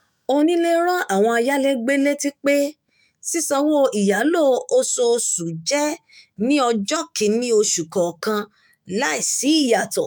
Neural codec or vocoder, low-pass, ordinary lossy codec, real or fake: autoencoder, 48 kHz, 128 numbers a frame, DAC-VAE, trained on Japanese speech; none; none; fake